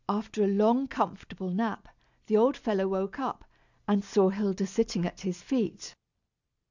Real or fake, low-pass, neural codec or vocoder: real; 7.2 kHz; none